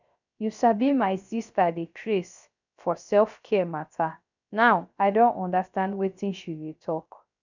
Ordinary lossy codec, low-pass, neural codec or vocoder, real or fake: none; 7.2 kHz; codec, 16 kHz, 0.3 kbps, FocalCodec; fake